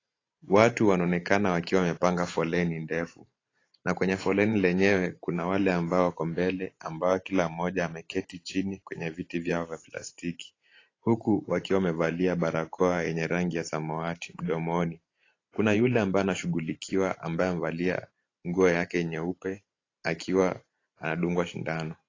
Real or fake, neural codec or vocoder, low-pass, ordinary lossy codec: fake; vocoder, 44.1 kHz, 128 mel bands every 512 samples, BigVGAN v2; 7.2 kHz; AAC, 32 kbps